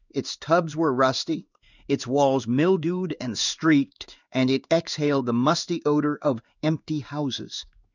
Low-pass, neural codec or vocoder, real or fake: 7.2 kHz; codec, 16 kHz in and 24 kHz out, 1 kbps, XY-Tokenizer; fake